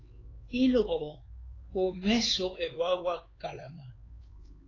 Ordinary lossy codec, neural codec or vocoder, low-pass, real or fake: AAC, 32 kbps; codec, 16 kHz, 4 kbps, X-Codec, HuBERT features, trained on LibriSpeech; 7.2 kHz; fake